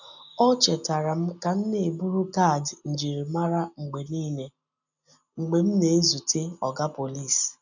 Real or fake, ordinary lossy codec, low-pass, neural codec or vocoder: real; none; 7.2 kHz; none